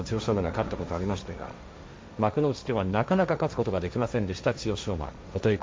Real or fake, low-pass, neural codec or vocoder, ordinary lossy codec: fake; none; codec, 16 kHz, 1.1 kbps, Voila-Tokenizer; none